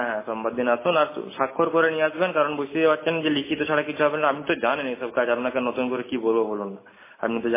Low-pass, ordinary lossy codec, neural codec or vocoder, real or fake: 3.6 kHz; MP3, 16 kbps; none; real